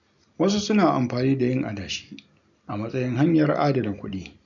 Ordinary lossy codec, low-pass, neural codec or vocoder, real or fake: none; 7.2 kHz; none; real